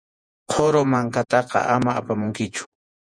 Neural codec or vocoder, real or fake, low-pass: vocoder, 48 kHz, 128 mel bands, Vocos; fake; 9.9 kHz